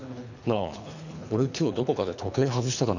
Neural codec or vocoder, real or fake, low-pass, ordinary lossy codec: codec, 24 kHz, 3 kbps, HILCodec; fake; 7.2 kHz; none